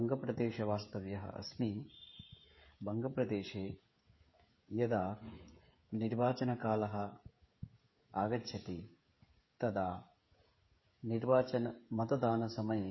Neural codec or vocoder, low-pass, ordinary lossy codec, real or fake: codec, 16 kHz, 16 kbps, FreqCodec, smaller model; 7.2 kHz; MP3, 24 kbps; fake